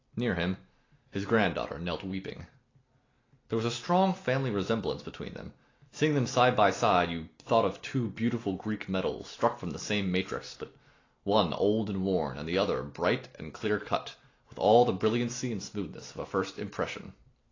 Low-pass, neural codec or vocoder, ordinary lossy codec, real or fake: 7.2 kHz; none; AAC, 32 kbps; real